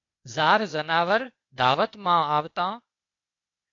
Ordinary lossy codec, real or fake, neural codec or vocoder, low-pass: AAC, 48 kbps; fake; codec, 16 kHz, 0.8 kbps, ZipCodec; 7.2 kHz